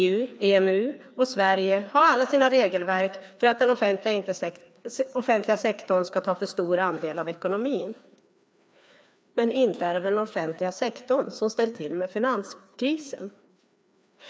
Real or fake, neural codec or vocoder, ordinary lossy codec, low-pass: fake; codec, 16 kHz, 2 kbps, FreqCodec, larger model; none; none